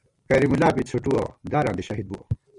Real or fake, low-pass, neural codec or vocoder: fake; 10.8 kHz; vocoder, 44.1 kHz, 128 mel bands every 256 samples, BigVGAN v2